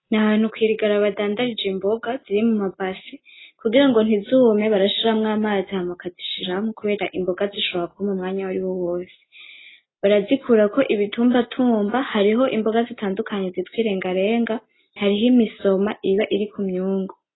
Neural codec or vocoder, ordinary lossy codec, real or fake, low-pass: none; AAC, 16 kbps; real; 7.2 kHz